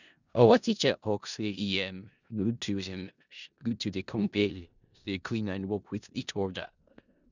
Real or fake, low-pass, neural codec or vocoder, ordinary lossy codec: fake; 7.2 kHz; codec, 16 kHz in and 24 kHz out, 0.4 kbps, LongCat-Audio-Codec, four codebook decoder; none